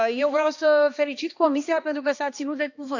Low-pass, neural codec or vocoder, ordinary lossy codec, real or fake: 7.2 kHz; codec, 16 kHz, 2 kbps, X-Codec, HuBERT features, trained on balanced general audio; none; fake